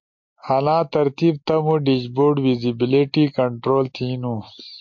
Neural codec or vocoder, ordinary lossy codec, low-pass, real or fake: none; MP3, 48 kbps; 7.2 kHz; real